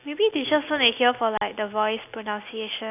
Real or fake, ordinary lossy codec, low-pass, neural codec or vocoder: real; none; 3.6 kHz; none